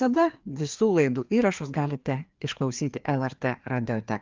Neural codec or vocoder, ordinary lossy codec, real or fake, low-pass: codec, 16 kHz, 2 kbps, FreqCodec, larger model; Opus, 32 kbps; fake; 7.2 kHz